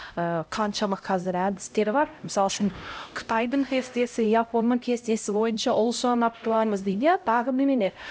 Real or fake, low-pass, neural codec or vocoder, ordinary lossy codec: fake; none; codec, 16 kHz, 0.5 kbps, X-Codec, HuBERT features, trained on LibriSpeech; none